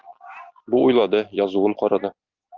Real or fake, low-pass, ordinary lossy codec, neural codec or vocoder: real; 7.2 kHz; Opus, 32 kbps; none